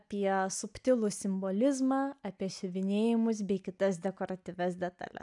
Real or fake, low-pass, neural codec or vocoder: real; 10.8 kHz; none